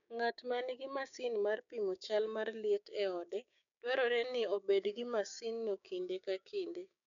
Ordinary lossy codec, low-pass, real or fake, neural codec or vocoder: none; 7.2 kHz; fake; codec, 16 kHz, 6 kbps, DAC